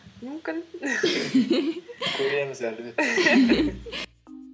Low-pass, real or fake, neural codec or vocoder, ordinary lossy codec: none; real; none; none